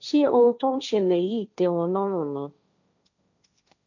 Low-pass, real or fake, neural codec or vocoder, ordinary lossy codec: 7.2 kHz; fake; codec, 16 kHz, 1.1 kbps, Voila-Tokenizer; none